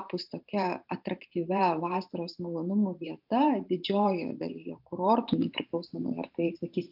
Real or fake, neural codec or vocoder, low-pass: fake; vocoder, 44.1 kHz, 128 mel bands every 512 samples, BigVGAN v2; 5.4 kHz